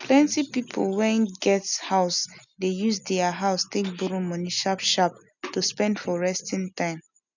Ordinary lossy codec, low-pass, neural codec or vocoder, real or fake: none; 7.2 kHz; none; real